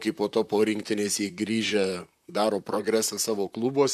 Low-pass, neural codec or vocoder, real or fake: 14.4 kHz; vocoder, 44.1 kHz, 128 mel bands, Pupu-Vocoder; fake